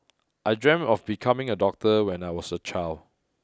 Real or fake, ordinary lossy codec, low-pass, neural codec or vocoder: real; none; none; none